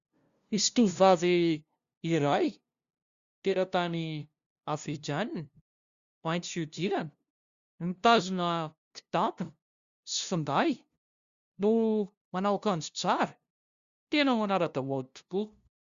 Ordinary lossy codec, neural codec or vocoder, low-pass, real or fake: Opus, 64 kbps; codec, 16 kHz, 0.5 kbps, FunCodec, trained on LibriTTS, 25 frames a second; 7.2 kHz; fake